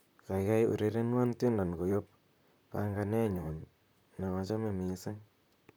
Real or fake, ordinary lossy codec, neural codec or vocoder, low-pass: fake; none; vocoder, 44.1 kHz, 128 mel bands, Pupu-Vocoder; none